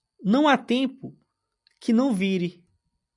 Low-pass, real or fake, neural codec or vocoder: 10.8 kHz; real; none